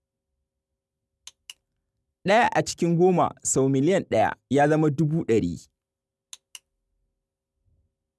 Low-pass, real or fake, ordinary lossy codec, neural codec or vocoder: none; real; none; none